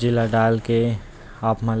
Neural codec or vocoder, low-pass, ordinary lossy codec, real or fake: none; none; none; real